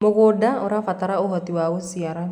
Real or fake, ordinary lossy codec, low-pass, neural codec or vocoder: real; none; 19.8 kHz; none